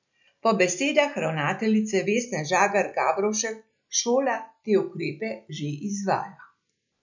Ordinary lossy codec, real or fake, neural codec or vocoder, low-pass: none; real; none; 7.2 kHz